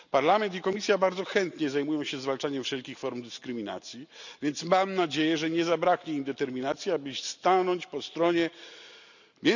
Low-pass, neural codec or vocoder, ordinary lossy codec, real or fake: 7.2 kHz; none; none; real